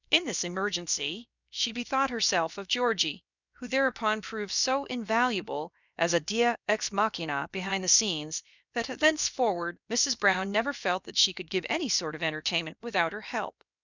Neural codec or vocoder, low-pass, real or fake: codec, 16 kHz, about 1 kbps, DyCAST, with the encoder's durations; 7.2 kHz; fake